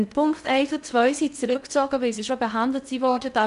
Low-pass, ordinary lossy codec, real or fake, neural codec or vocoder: 10.8 kHz; none; fake; codec, 16 kHz in and 24 kHz out, 0.6 kbps, FocalCodec, streaming, 2048 codes